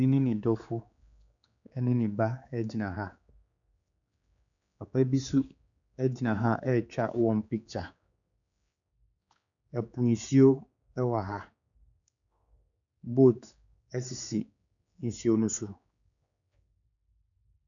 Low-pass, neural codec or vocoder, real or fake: 7.2 kHz; codec, 16 kHz, 4 kbps, X-Codec, HuBERT features, trained on general audio; fake